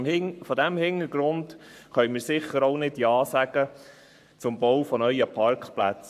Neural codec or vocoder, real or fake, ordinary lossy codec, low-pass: codec, 44.1 kHz, 7.8 kbps, Pupu-Codec; fake; none; 14.4 kHz